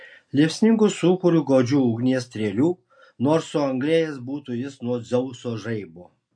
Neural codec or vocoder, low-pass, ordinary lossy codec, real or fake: none; 9.9 kHz; MP3, 48 kbps; real